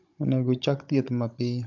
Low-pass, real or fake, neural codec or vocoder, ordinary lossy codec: 7.2 kHz; fake; codec, 16 kHz, 16 kbps, FunCodec, trained on Chinese and English, 50 frames a second; MP3, 64 kbps